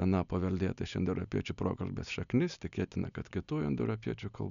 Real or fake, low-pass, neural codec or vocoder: real; 7.2 kHz; none